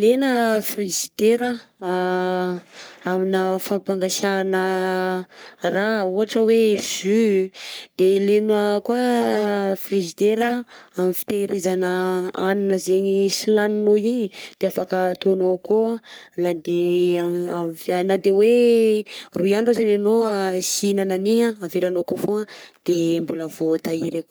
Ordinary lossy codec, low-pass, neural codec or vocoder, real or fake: none; none; codec, 44.1 kHz, 3.4 kbps, Pupu-Codec; fake